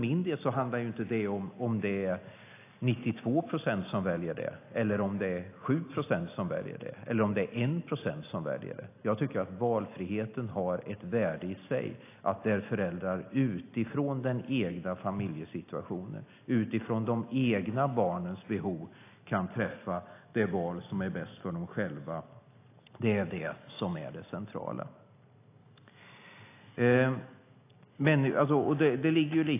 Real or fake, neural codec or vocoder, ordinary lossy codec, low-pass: real; none; AAC, 24 kbps; 3.6 kHz